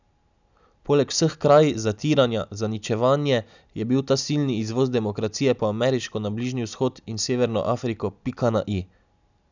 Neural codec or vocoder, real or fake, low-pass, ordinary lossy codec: none; real; 7.2 kHz; none